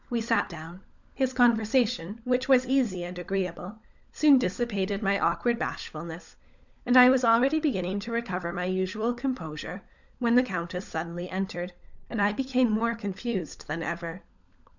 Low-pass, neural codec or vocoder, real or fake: 7.2 kHz; codec, 16 kHz, 16 kbps, FunCodec, trained on LibriTTS, 50 frames a second; fake